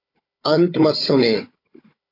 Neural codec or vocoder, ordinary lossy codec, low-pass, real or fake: codec, 16 kHz, 16 kbps, FunCodec, trained on Chinese and English, 50 frames a second; AAC, 32 kbps; 5.4 kHz; fake